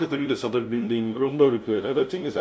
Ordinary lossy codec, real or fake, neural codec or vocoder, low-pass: none; fake; codec, 16 kHz, 0.5 kbps, FunCodec, trained on LibriTTS, 25 frames a second; none